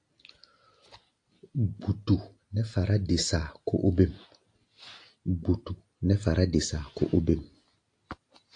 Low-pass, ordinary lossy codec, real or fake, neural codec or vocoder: 9.9 kHz; AAC, 48 kbps; real; none